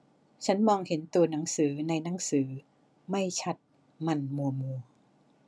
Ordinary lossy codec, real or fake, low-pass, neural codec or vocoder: none; real; none; none